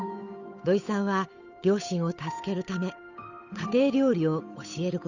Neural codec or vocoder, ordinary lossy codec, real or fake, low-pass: codec, 16 kHz, 8 kbps, FunCodec, trained on Chinese and English, 25 frames a second; none; fake; 7.2 kHz